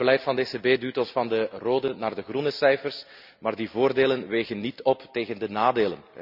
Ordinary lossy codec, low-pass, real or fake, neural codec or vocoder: none; 5.4 kHz; real; none